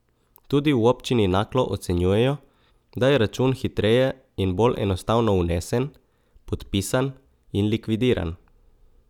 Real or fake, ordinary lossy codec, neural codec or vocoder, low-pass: real; none; none; 19.8 kHz